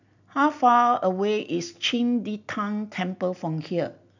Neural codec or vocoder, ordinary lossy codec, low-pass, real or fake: none; none; 7.2 kHz; real